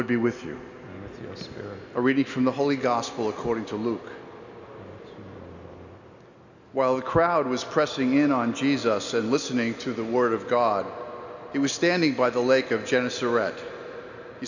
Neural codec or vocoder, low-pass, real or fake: none; 7.2 kHz; real